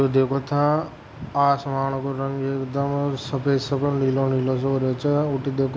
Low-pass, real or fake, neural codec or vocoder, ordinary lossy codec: none; real; none; none